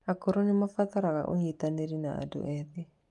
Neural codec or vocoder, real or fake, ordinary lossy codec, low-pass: none; real; Opus, 32 kbps; 10.8 kHz